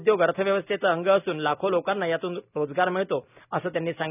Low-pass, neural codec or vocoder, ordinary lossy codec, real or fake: 3.6 kHz; none; none; real